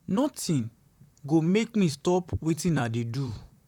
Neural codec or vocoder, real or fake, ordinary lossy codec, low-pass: vocoder, 44.1 kHz, 128 mel bands, Pupu-Vocoder; fake; none; 19.8 kHz